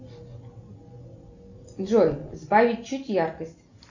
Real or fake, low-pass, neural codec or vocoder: real; 7.2 kHz; none